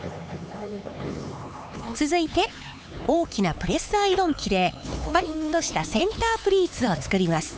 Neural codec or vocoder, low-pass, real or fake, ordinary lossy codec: codec, 16 kHz, 4 kbps, X-Codec, HuBERT features, trained on LibriSpeech; none; fake; none